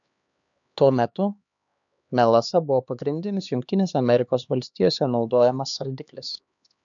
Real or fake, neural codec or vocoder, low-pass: fake; codec, 16 kHz, 2 kbps, X-Codec, HuBERT features, trained on LibriSpeech; 7.2 kHz